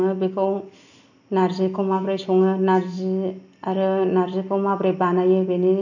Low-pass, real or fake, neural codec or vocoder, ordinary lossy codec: 7.2 kHz; real; none; none